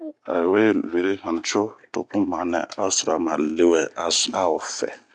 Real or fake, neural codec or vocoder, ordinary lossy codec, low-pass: real; none; MP3, 96 kbps; 10.8 kHz